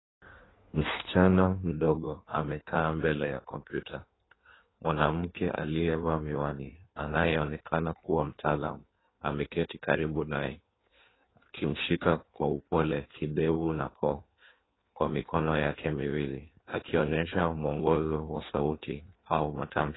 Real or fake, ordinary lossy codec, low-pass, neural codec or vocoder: fake; AAC, 16 kbps; 7.2 kHz; codec, 16 kHz in and 24 kHz out, 1.1 kbps, FireRedTTS-2 codec